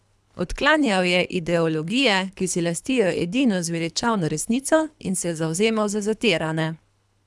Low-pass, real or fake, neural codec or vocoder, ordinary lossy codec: none; fake; codec, 24 kHz, 3 kbps, HILCodec; none